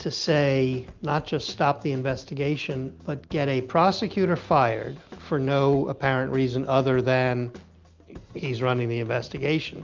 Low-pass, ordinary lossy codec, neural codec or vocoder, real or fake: 7.2 kHz; Opus, 24 kbps; none; real